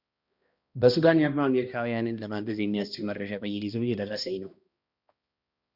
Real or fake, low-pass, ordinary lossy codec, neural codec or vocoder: fake; 5.4 kHz; Opus, 64 kbps; codec, 16 kHz, 1 kbps, X-Codec, HuBERT features, trained on balanced general audio